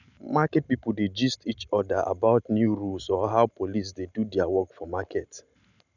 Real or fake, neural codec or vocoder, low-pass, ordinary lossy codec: real; none; 7.2 kHz; none